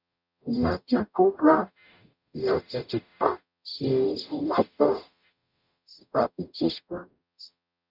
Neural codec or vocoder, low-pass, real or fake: codec, 44.1 kHz, 0.9 kbps, DAC; 5.4 kHz; fake